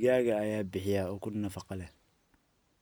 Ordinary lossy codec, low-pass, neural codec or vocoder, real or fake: none; none; none; real